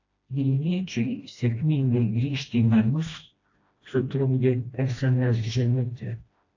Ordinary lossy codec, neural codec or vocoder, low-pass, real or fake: AAC, 48 kbps; codec, 16 kHz, 1 kbps, FreqCodec, smaller model; 7.2 kHz; fake